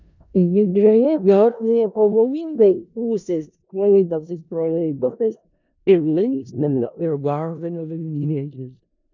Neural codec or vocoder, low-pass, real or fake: codec, 16 kHz in and 24 kHz out, 0.4 kbps, LongCat-Audio-Codec, four codebook decoder; 7.2 kHz; fake